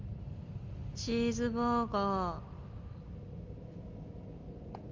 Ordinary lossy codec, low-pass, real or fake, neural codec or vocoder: Opus, 32 kbps; 7.2 kHz; real; none